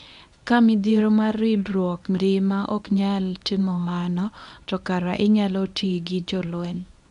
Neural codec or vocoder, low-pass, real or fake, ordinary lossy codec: codec, 24 kHz, 0.9 kbps, WavTokenizer, medium speech release version 1; 10.8 kHz; fake; none